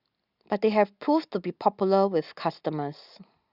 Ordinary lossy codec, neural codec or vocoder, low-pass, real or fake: Opus, 64 kbps; none; 5.4 kHz; real